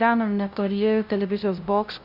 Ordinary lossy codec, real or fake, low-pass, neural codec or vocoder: Opus, 64 kbps; fake; 5.4 kHz; codec, 16 kHz, 0.5 kbps, FunCodec, trained on LibriTTS, 25 frames a second